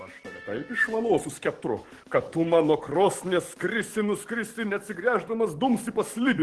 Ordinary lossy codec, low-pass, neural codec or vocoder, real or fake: Opus, 16 kbps; 10.8 kHz; codec, 44.1 kHz, 7.8 kbps, DAC; fake